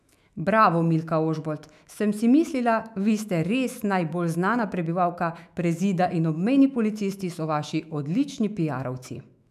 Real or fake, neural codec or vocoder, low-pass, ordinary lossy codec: fake; autoencoder, 48 kHz, 128 numbers a frame, DAC-VAE, trained on Japanese speech; 14.4 kHz; none